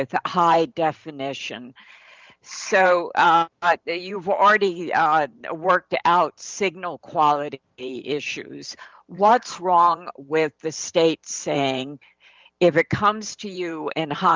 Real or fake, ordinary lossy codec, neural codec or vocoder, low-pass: fake; Opus, 24 kbps; vocoder, 44.1 kHz, 128 mel bands every 512 samples, BigVGAN v2; 7.2 kHz